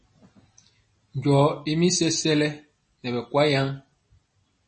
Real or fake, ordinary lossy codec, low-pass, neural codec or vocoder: real; MP3, 32 kbps; 10.8 kHz; none